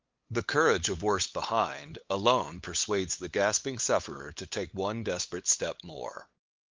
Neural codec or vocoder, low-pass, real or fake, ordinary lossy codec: codec, 16 kHz, 8 kbps, FunCodec, trained on LibriTTS, 25 frames a second; 7.2 kHz; fake; Opus, 24 kbps